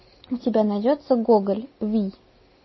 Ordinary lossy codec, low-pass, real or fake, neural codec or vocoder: MP3, 24 kbps; 7.2 kHz; real; none